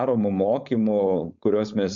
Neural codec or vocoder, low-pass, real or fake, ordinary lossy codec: codec, 16 kHz, 4.8 kbps, FACodec; 7.2 kHz; fake; MP3, 64 kbps